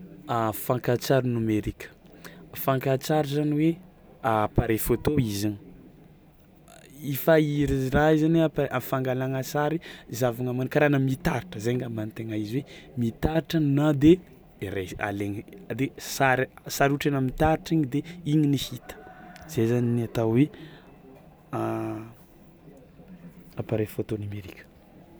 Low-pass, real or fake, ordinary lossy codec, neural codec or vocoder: none; real; none; none